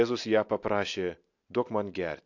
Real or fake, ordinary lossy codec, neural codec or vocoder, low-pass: real; AAC, 48 kbps; none; 7.2 kHz